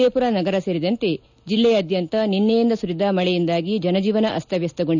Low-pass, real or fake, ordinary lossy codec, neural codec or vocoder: 7.2 kHz; real; none; none